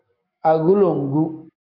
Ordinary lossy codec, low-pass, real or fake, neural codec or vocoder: AAC, 48 kbps; 5.4 kHz; fake; codec, 44.1 kHz, 7.8 kbps, DAC